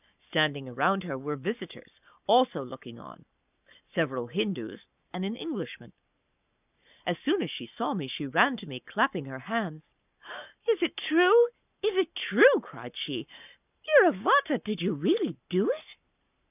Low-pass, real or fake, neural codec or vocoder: 3.6 kHz; fake; codec, 44.1 kHz, 7.8 kbps, Pupu-Codec